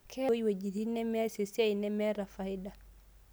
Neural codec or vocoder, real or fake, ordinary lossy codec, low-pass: none; real; none; none